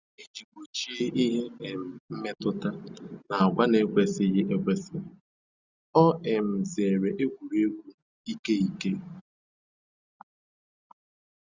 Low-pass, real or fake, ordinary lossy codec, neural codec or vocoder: 7.2 kHz; real; Opus, 64 kbps; none